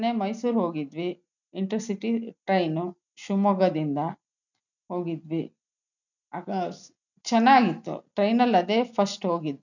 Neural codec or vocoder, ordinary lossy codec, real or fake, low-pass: none; none; real; 7.2 kHz